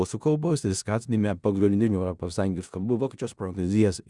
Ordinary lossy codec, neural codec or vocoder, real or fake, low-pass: Opus, 64 kbps; codec, 16 kHz in and 24 kHz out, 0.4 kbps, LongCat-Audio-Codec, four codebook decoder; fake; 10.8 kHz